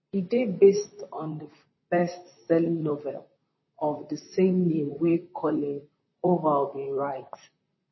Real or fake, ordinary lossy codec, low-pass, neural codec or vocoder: fake; MP3, 24 kbps; 7.2 kHz; vocoder, 44.1 kHz, 128 mel bands, Pupu-Vocoder